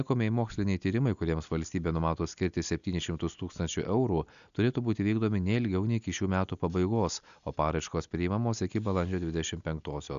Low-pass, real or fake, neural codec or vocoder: 7.2 kHz; real; none